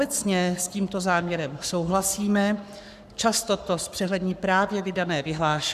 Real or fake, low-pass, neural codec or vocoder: fake; 14.4 kHz; codec, 44.1 kHz, 7.8 kbps, Pupu-Codec